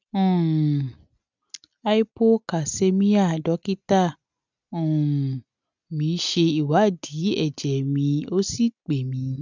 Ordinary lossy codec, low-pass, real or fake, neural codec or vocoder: none; 7.2 kHz; real; none